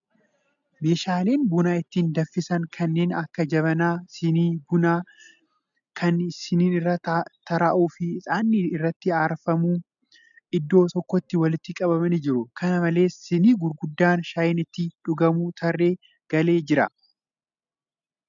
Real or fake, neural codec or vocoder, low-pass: real; none; 7.2 kHz